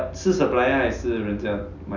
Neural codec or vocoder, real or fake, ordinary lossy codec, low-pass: none; real; none; 7.2 kHz